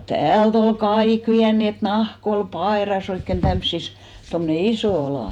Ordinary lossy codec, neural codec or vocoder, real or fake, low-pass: none; vocoder, 48 kHz, 128 mel bands, Vocos; fake; 19.8 kHz